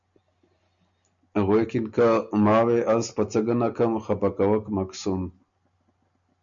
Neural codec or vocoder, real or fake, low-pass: none; real; 7.2 kHz